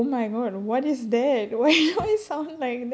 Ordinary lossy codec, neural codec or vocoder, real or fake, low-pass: none; none; real; none